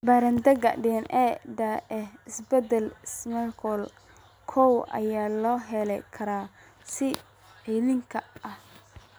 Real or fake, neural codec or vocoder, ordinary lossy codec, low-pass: real; none; none; none